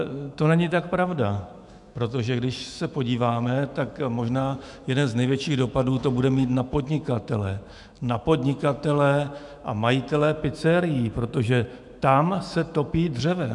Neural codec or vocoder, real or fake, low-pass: autoencoder, 48 kHz, 128 numbers a frame, DAC-VAE, trained on Japanese speech; fake; 10.8 kHz